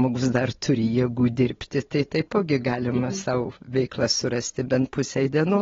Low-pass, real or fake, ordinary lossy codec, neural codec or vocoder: 7.2 kHz; real; AAC, 24 kbps; none